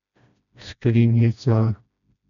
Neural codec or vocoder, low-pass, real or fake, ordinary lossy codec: codec, 16 kHz, 1 kbps, FreqCodec, smaller model; 7.2 kHz; fake; none